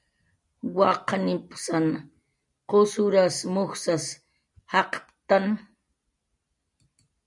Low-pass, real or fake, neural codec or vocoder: 10.8 kHz; real; none